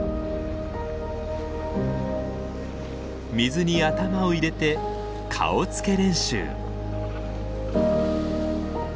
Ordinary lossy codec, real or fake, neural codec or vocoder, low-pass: none; real; none; none